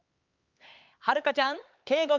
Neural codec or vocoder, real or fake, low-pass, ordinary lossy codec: codec, 16 kHz, 4 kbps, X-Codec, HuBERT features, trained on LibriSpeech; fake; 7.2 kHz; Opus, 24 kbps